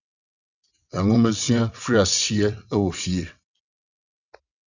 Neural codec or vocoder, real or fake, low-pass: vocoder, 22.05 kHz, 80 mel bands, WaveNeXt; fake; 7.2 kHz